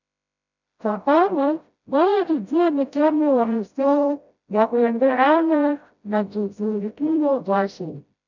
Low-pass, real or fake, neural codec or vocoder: 7.2 kHz; fake; codec, 16 kHz, 0.5 kbps, FreqCodec, smaller model